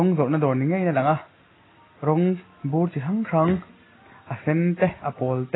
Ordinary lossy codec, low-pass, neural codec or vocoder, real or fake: AAC, 16 kbps; 7.2 kHz; none; real